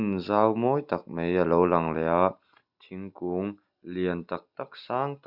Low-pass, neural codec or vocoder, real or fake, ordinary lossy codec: 5.4 kHz; none; real; Opus, 64 kbps